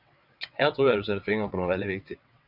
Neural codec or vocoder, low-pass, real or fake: vocoder, 22.05 kHz, 80 mel bands, WaveNeXt; 5.4 kHz; fake